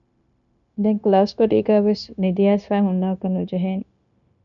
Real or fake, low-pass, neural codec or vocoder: fake; 7.2 kHz; codec, 16 kHz, 0.9 kbps, LongCat-Audio-Codec